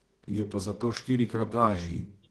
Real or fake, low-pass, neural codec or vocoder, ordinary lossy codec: fake; 10.8 kHz; codec, 24 kHz, 0.9 kbps, WavTokenizer, medium music audio release; Opus, 16 kbps